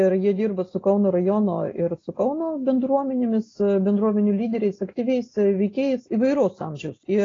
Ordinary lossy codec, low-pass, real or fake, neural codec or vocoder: AAC, 32 kbps; 7.2 kHz; real; none